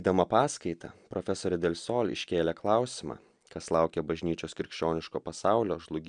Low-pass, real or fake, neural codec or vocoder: 9.9 kHz; real; none